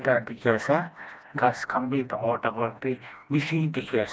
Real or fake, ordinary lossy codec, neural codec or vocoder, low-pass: fake; none; codec, 16 kHz, 1 kbps, FreqCodec, smaller model; none